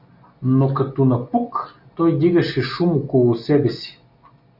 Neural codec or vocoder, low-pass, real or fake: none; 5.4 kHz; real